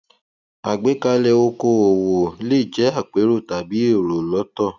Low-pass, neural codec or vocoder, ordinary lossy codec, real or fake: 7.2 kHz; none; none; real